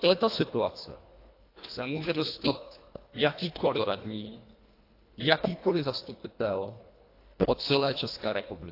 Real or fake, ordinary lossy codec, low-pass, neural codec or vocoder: fake; AAC, 32 kbps; 5.4 kHz; codec, 24 kHz, 1.5 kbps, HILCodec